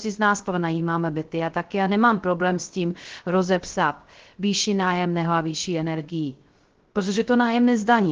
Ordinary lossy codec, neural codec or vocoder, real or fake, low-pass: Opus, 16 kbps; codec, 16 kHz, 0.3 kbps, FocalCodec; fake; 7.2 kHz